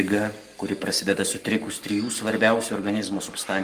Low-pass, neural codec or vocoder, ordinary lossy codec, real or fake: 14.4 kHz; codec, 44.1 kHz, 7.8 kbps, Pupu-Codec; Opus, 32 kbps; fake